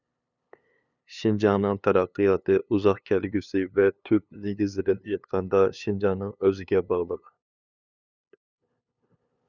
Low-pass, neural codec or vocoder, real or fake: 7.2 kHz; codec, 16 kHz, 2 kbps, FunCodec, trained on LibriTTS, 25 frames a second; fake